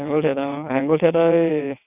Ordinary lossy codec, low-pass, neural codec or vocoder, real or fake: none; 3.6 kHz; vocoder, 22.05 kHz, 80 mel bands, WaveNeXt; fake